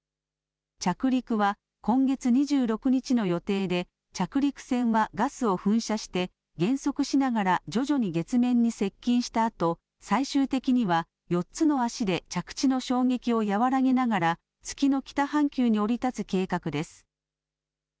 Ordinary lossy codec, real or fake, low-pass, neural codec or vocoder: none; real; none; none